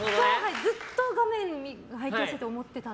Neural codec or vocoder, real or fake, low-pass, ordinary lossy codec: none; real; none; none